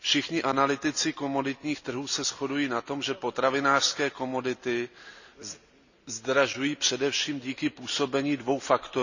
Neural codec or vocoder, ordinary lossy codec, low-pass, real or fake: none; none; 7.2 kHz; real